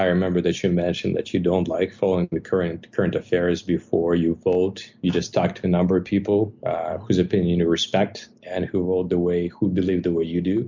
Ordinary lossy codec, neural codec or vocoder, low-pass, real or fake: MP3, 64 kbps; none; 7.2 kHz; real